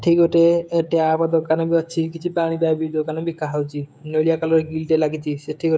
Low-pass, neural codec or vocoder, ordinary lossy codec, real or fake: none; codec, 16 kHz, 16 kbps, FreqCodec, smaller model; none; fake